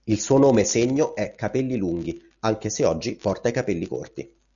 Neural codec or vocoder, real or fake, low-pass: none; real; 7.2 kHz